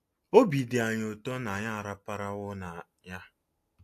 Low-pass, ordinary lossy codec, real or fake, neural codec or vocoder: 14.4 kHz; AAC, 64 kbps; real; none